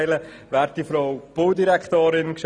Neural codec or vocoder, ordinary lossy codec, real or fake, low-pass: none; none; real; none